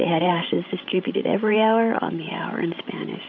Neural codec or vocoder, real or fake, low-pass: codec, 16 kHz, 16 kbps, FreqCodec, larger model; fake; 7.2 kHz